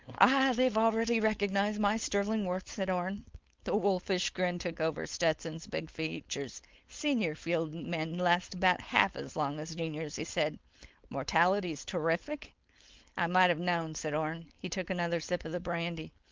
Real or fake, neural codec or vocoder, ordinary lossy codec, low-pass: fake; codec, 16 kHz, 4.8 kbps, FACodec; Opus, 32 kbps; 7.2 kHz